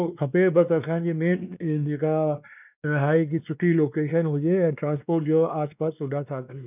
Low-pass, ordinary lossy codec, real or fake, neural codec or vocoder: 3.6 kHz; none; fake; codec, 16 kHz, 2 kbps, X-Codec, WavLM features, trained on Multilingual LibriSpeech